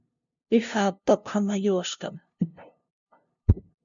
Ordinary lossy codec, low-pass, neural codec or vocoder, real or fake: MP3, 64 kbps; 7.2 kHz; codec, 16 kHz, 0.5 kbps, FunCodec, trained on LibriTTS, 25 frames a second; fake